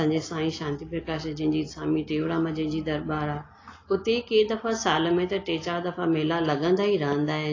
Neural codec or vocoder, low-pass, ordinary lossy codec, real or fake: none; 7.2 kHz; AAC, 32 kbps; real